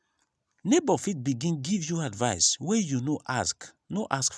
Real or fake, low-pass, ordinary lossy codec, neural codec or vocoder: real; none; none; none